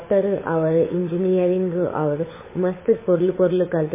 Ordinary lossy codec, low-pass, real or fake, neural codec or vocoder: MP3, 16 kbps; 3.6 kHz; fake; codec, 16 kHz, 16 kbps, FunCodec, trained on LibriTTS, 50 frames a second